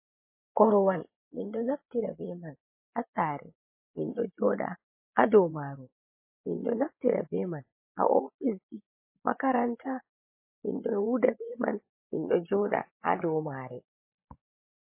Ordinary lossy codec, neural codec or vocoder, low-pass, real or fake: MP3, 24 kbps; vocoder, 24 kHz, 100 mel bands, Vocos; 3.6 kHz; fake